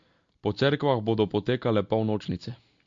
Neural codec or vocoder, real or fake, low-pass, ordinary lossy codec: none; real; 7.2 kHz; MP3, 48 kbps